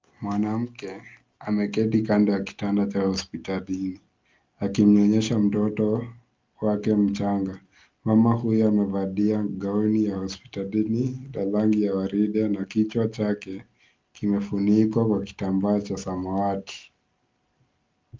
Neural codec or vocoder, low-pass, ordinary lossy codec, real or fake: none; 7.2 kHz; Opus, 24 kbps; real